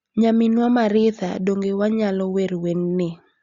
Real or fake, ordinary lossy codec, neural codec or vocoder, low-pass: real; Opus, 64 kbps; none; 7.2 kHz